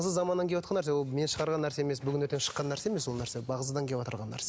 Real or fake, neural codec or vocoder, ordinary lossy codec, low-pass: real; none; none; none